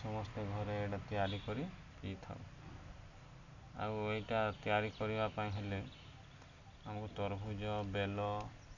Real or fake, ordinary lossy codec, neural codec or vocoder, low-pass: real; none; none; 7.2 kHz